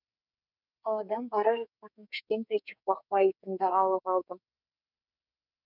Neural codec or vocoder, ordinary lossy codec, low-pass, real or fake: codec, 44.1 kHz, 2.6 kbps, SNAC; none; 5.4 kHz; fake